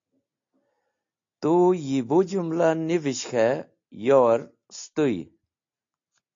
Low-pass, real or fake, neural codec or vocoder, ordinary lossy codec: 7.2 kHz; real; none; AAC, 48 kbps